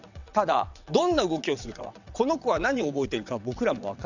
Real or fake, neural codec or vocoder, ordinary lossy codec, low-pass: fake; vocoder, 22.05 kHz, 80 mel bands, WaveNeXt; none; 7.2 kHz